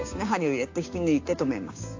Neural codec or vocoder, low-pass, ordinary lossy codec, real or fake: codec, 16 kHz, 6 kbps, DAC; 7.2 kHz; AAC, 48 kbps; fake